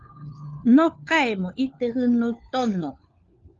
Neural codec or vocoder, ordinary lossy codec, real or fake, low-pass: codec, 16 kHz, 4 kbps, FunCodec, trained on LibriTTS, 50 frames a second; Opus, 32 kbps; fake; 7.2 kHz